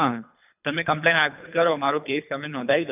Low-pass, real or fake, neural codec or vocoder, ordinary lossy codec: 3.6 kHz; fake; codec, 24 kHz, 3 kbps, HILCodec; none